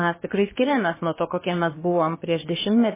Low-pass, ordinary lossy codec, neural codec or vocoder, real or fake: 3.6 kHz; MP3, 16 kbps; codec, 16 kHz, about 1 kbps, DyCAST, with the encoder's durations; fake